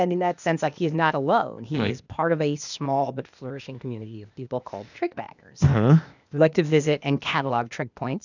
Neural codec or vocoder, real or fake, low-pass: codec, 16 kHz, 0.8 kbps, ZipCodec; fake; 7.2 kHz